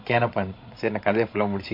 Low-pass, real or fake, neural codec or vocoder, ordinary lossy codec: 5.4 kHz; fake; codec, 16 kHz, 16 kbps, FreqCodec, larger model; MP3, 32 kbps